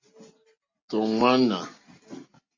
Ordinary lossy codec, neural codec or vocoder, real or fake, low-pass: MP3, 32 kbps; none; real; 7.2 kHz